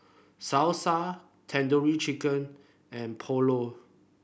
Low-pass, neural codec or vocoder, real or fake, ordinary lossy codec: none; none; real; none